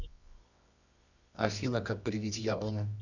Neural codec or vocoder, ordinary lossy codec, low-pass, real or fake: codec, 24 kHz, 0.9 kbps, WavTokenizer, medium music audio release; none; 7.2 kHz; fake